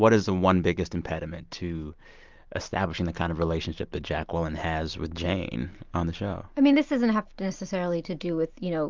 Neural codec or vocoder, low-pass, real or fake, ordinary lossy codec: none; 7.2 kHz; real; Opus, 24 kbps